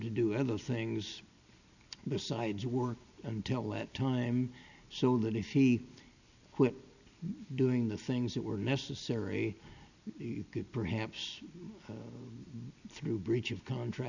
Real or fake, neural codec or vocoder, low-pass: real; none; 7.2 kHz